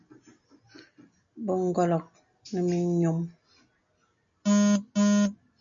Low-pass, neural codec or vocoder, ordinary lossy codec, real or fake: 7.2 kHz; none; MP3, 48 kbps; real